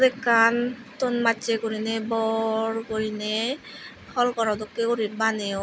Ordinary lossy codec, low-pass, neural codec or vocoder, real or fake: none; none; none; real